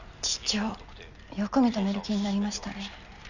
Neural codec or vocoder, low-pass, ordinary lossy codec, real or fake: none; 7.2 kHz; none; real